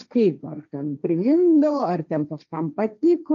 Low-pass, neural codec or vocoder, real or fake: 7.2 kHz; codec, 16 kHz, 1.1 kbps, Voila-Tokenizer; fake